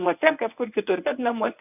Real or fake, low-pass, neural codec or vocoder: fake; 3.6 kHz; codec, 24 kHz, 0.9 kbps, WavTokenizer, medium speech release version 1